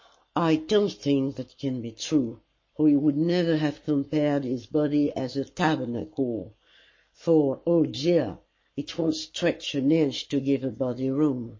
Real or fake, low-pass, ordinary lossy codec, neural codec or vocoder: fake; 7.2 kHz; MP3, 32 kbps; codec, 44.1 kHz, 7.8 kbps, Pupu-Codec